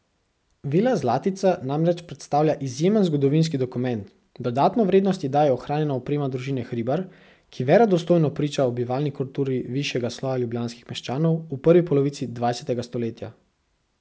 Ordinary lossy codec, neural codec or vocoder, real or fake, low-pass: none; none; real; none